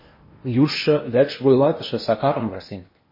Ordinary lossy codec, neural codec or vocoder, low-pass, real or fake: MP3, 24 kbps; codec, 16 kHz in and 24 kHz out, 0.8 kbps, FocalCodec, streaming, 65536 codes; 5.4 kHz; fake